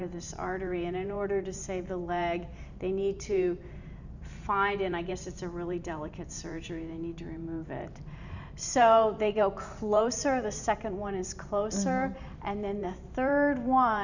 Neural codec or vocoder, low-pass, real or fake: vocoder, 44.1 kHz, 128 mel bands every 512 samples, BigVGAN v2; 7.2 kHz; fake